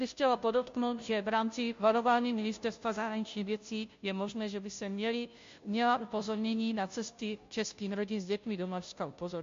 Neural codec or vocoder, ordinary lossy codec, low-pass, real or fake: codec, 16 kHz, 0.5 kbps, FunCodec, trained on Chinese and English, 25 frames a second; MP3, 48 kbps; 7.2 kHz; fake